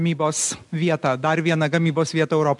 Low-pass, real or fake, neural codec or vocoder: 10.8 kHz; real; none